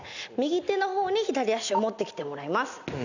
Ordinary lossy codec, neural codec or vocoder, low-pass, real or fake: none; none; 7.2 kHz; real